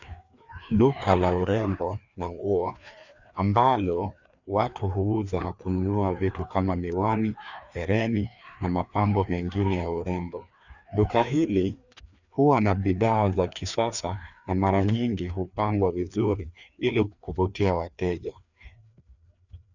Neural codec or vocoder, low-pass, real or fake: codec, 16 kHz, 2 kbps, FreqCodec, larger model; 7.2 kHz; fake